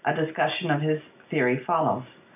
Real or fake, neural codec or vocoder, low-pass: real; none; 3.6 kHz